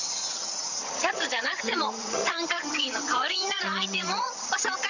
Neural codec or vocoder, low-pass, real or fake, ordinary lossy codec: vocoder, 22.05 kHz, 80 mel bands, HiFi-GAN; 7.2 kHz; fake; none